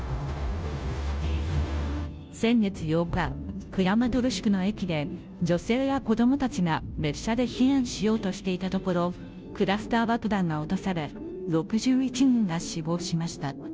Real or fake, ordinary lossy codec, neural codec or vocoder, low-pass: fake; none; codec, 16 kHz, 0.5 kbps, FunCodec, trained on Chinese and English, 25 frames a second; none